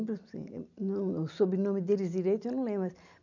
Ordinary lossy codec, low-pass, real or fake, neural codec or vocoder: none; 7.2 kHz; real; none